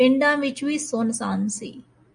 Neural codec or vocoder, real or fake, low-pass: none; real; 9.9 kHz